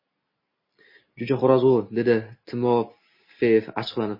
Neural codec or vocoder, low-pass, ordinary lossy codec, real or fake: none; 5.4 kHz; MP3, 24 kbps; real